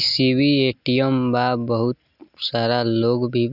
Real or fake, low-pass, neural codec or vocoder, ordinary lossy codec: real; 5.4 kHz; none; none